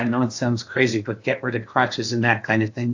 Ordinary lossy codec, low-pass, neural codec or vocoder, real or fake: AAC, 48 kbps; 7.2 kHz; codec, 16 kHz in and 24 kHz out, 0.8 kbps, FocalCodec, streaming, 65536 codes; fake